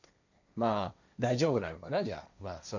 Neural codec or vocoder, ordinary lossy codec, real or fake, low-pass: codec, 16 kHz, 1.1 kbps, Voila-Tokenizer; none; fake; 7.2 kHz